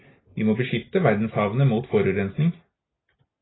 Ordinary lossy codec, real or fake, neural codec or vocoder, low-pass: AAC, 16 kbps; real; none; 7.2 kHz